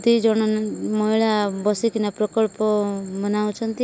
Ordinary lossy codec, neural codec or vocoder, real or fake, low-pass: none; none; real; none